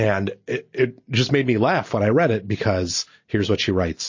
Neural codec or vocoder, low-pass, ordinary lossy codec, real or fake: none; 7.2 kHz; MP3, 32 kbps; real